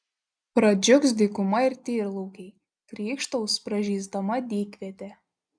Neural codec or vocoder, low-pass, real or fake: none; 9.9 kHz; real